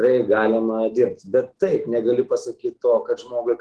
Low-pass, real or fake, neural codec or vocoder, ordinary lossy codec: 10.8 kHz; fake; autoencoder, 48 kHz, 128 numbers a frame, DAC-VAE, trained on Japanese speech; Opus, 16 kbps